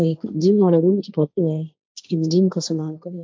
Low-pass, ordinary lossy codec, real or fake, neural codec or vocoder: none; none; fake; codec, 16 kHz, 1.1 kbps, Voila-Tokenizer